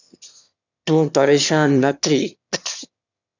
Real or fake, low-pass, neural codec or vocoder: fake; 7.2 kHz; autoencoder, 22.05 kHz, a latent of 192 numbers a frame, VITS, trained on one speaker